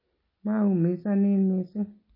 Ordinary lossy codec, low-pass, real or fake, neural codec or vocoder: MP3, 24 kbps; 5.4 kHz; real; none